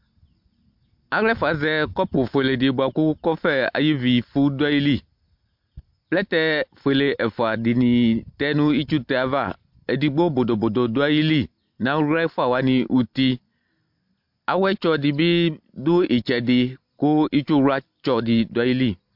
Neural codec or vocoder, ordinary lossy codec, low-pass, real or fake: none; MP3, 48 kbps; 5.4 kHz; real